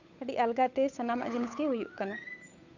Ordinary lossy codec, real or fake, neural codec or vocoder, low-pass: none; fake; codec, 16 kHz, 8 kbps, FunCodec, trained on Chinese and English, 25 frames a second; 7.2 kHz